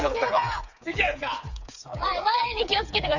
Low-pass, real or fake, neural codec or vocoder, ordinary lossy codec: 7.2 kHz; fake; codec, 16 kHz, 4 kbps, FreqCodec, smaller model; none